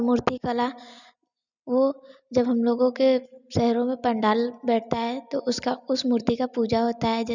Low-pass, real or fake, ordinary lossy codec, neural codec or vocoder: 7.2 kHz; real; none; none